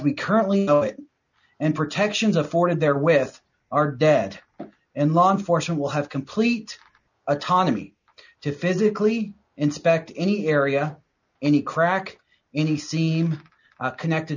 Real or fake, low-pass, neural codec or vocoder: real; 7.2 kHz; none